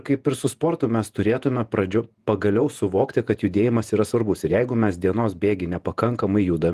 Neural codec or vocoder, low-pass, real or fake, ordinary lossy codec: vocoder, 48 kHz, 128 mel bands, Vocos; 14.4 kHz; fake; Opus, 24 kbps